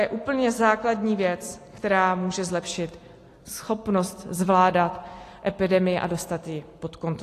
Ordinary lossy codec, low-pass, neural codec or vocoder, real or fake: AAC, 48 kbps; 14.4 kHz; none; real